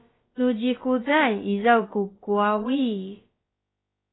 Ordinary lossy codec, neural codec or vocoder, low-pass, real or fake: AAC, 16 kbps; codec, 16 kHz, about 1 kbps, DyCAST, with the encoder's durations; 7.2 kHz; fake